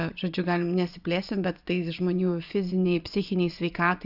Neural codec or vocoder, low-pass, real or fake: vocoder, 24 kHz, 100 mel bands, Vocos; 5.4 kHz; fake